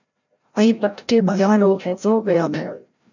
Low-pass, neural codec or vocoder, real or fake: 7.2 kHz; codec, 16 kHz, 0.5 kbps, FreqCodec, larger model; fake